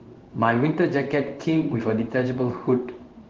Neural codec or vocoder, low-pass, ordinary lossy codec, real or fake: codec, 16 kHz in and 24 kHz out, 1 kbps, XY-Tokenizer; 7.2 kHz; Opus, 16 kbps; fake